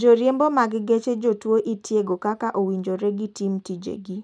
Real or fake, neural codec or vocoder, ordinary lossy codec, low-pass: real; none; none; 9.9 kHz